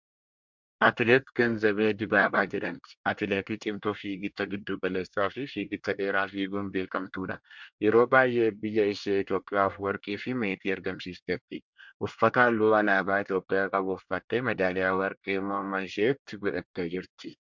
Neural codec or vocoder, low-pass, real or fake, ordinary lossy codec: codec, 24 kHz, 1 kbps, SNAC; 7.2 kHz; fake; MP3, 64 kbps